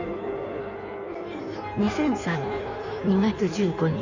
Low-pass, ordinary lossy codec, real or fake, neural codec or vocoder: 7.2 kHz; none; fake; codec, 16 kHz in and 24 kHz out, 1.1 kbps, FireRedTTS-2 codec